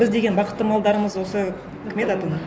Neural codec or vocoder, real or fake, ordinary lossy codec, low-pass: none; real; none; none